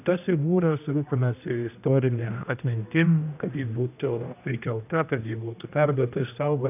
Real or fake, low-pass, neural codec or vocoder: fake; 3.6 kHz; codec, 16 kHz, 1 kbps, X-Codec, HuBERT features, trained on general audio